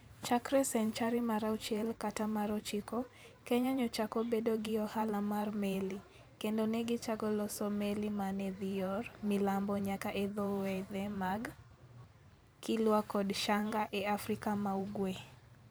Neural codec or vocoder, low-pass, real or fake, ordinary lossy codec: vocoder, 44.1 kHz, 128 mel bands every 512 samples, BigVGAN v2; none; fake; none